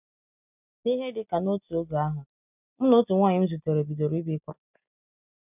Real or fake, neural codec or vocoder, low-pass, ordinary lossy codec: real; none; 3.6 kHz; none